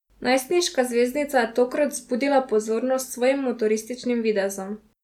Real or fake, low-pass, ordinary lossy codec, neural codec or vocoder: real; 19.8 kHz; none; none